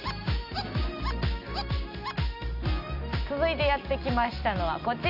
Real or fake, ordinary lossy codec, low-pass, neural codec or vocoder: real; none; 5.4 kHz; none